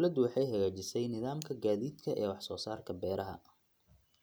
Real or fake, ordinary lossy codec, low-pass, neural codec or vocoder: real; none; none; none